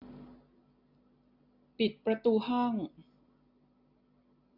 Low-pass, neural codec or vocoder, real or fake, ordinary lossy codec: 5.4 kHz; none; real; none